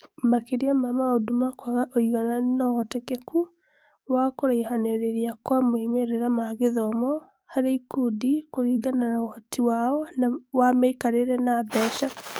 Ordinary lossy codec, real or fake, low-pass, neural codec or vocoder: none; fake; none; codec, 44.1 kHz, 7.8 kbps, DAC